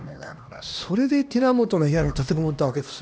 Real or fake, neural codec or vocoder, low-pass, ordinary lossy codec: fake; codec, 16 kHz, 1 kbps, X-Codec, HuBERT features, trained on LibriSpeech; none; none